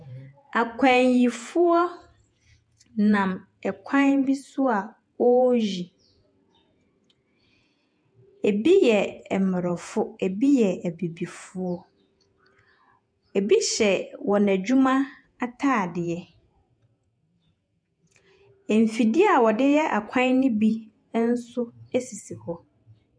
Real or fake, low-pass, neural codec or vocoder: fake; 9.9 kHz; vocoder, 48 kHz, 128 mel bands, Vocos